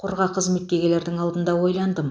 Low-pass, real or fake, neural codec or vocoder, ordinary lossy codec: none; real; none; none